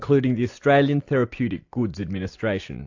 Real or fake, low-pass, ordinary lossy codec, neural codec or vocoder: real; 7.2 kHz; AAC, 48 kbps; none